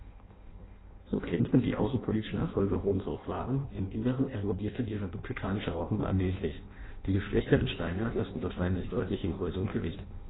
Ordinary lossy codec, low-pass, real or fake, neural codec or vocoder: AAC, 16 kbps; 7.2 kHz; fake; codec, 16 kHz in and 24 kHz out, 0.6 kbps, FireRedTTS-2 codec